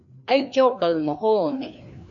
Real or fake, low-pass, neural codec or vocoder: fake; 7.2 kHz; codec, 16 kHz, 2 kbps, FreqCodec, larger model